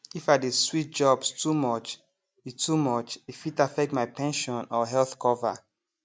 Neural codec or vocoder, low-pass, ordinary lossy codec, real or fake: none; none; none; real